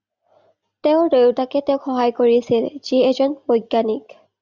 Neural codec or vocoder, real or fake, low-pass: none; real; 7.2 kHz